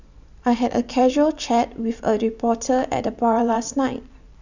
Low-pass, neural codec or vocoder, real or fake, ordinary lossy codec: 7.2 kHz; vocoder, 44.1 kHz, 128 mel bands every 512 samples, BigVGAN v2; fake; none